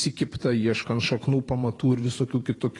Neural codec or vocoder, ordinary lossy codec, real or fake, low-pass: vocoder, 44.1 kHz, 128 mel bands every 512 samples, BigVGAN v2; AAC, 32 kbps; fake; 10.8 kHz